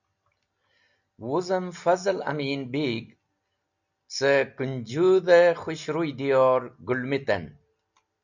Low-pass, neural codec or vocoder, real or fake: 7.2 kHz; none; real